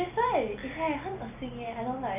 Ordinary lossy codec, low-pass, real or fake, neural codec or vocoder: none; 3.6 kHz; real; none